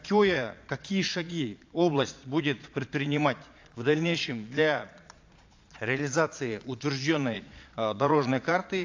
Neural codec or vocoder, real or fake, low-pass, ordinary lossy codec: vocoder, 22.05 kHz, 80 mel bands, Vocos; fake; 7.2 kHz; AAC, 48 kbps